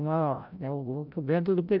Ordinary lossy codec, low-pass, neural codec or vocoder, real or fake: none; 5.4 kHz; codec, 16 kHz, 0.5 kbps, FreqCodec, larger model; fake